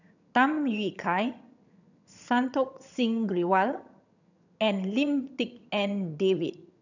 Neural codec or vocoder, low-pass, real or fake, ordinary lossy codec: vocoder, 22.05 kHz, 80 mel bands, HiFi-GAN; 7.2 kHz; fake; none